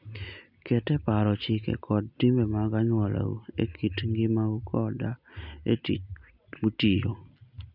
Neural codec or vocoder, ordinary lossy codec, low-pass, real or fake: none; none; 5.4 kHz; real